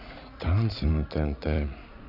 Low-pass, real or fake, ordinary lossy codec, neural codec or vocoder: 5.4 kHz; fake; none; vocoder, 44.1 kHz, 80 mel bands, Vocos